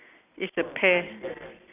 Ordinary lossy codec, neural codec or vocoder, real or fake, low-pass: none; none; real; 3.6 kHz